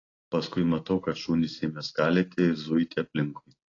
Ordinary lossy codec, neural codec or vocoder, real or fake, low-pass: AAC, 32 kbps; none; real; 7.2 kHz